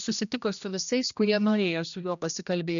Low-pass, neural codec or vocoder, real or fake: 7.2 kHz; codec, 16 kHz, 1 kbps, X-Codec, HuBERT features, trained on general audio; fake